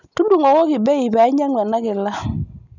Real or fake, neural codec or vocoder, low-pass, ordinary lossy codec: real; none; 7.2 kHz; none